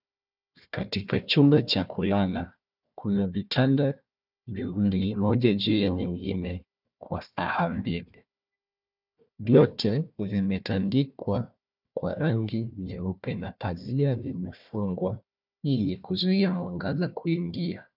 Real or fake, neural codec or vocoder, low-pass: fake; codec, 16 kHz, 1 kbps, FunCodec, trained on Chinese and English, 50 frames a second; 5.4 kHz